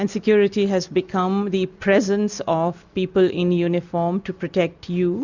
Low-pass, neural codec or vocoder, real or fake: 7.2 kHz; none; real